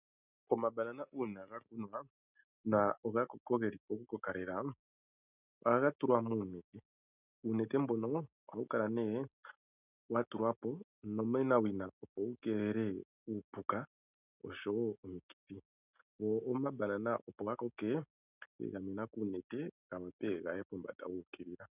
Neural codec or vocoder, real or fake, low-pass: none; real; 3.6 kHz